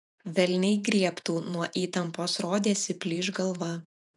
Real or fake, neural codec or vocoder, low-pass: fake; vocoder, 48 kHz, 128 mel bands, Vocos; 10.8 kHz